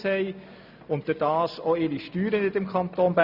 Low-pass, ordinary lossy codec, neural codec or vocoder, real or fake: 5.4 kHz; none; none; real